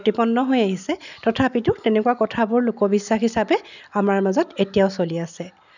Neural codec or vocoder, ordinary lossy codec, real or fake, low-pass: none; none; real; 7.2 kHz